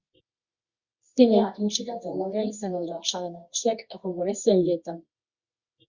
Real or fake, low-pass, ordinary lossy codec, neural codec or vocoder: fake; 7.2 kHz; Opus, 64 kbps; codec, 24 kHz, 0.9 kbps, WavTokenizer, medium music audio release